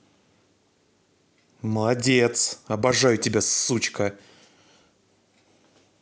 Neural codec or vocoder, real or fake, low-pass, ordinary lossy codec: none; real; none; none